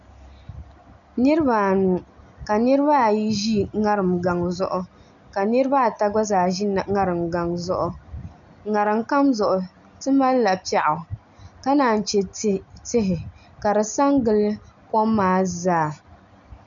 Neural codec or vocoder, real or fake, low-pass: none; real; 7.2 kHz